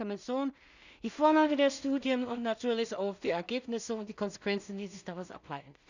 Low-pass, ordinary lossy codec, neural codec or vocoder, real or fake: 7.2 kHz; none; codec, 16 kHz in and 24 kHz out, 0.4 kbps, LongCat-Audio-Codec, two codebook decoder; fake